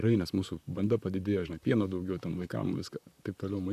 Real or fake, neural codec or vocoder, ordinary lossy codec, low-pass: fake; vocoder, 44.1 kHz, 128 mel bands, Pupu-Vocoder; AAC, 96 kbps; 14.4 kHz